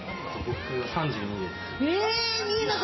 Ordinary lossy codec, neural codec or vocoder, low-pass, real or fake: MP3, 24 kbps; none; 7.2 kHz; real